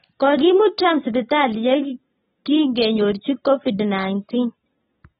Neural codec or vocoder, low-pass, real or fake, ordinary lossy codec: none; 19.8 kHz; real; AAC, 16 kbps